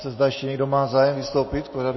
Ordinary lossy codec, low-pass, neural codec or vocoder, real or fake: MP3, 24 kbps; 7.2 kHz; none; real